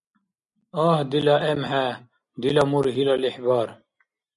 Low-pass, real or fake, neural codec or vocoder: 10.8 kHz; real; none